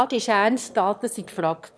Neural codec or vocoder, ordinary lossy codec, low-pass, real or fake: autoencoder, 22.05 kHz, a latent of 192 numbers a frame, VITS, trained on one speaker; none; none; fake